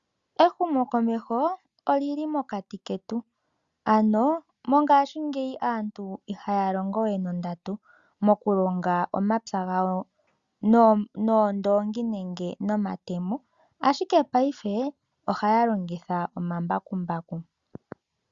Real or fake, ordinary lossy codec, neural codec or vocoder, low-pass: real; Opus, 64 kbps; none; 7.2 kHz